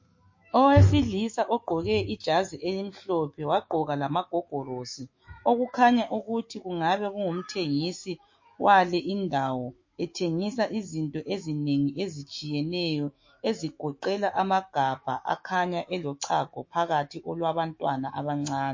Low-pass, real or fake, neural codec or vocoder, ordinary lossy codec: 7.2 kHz; fake; autoencoder, 48 kHz, 128 numbers a frame, DAC-VAE, trained on Japanese speech; MP3, 32 kbps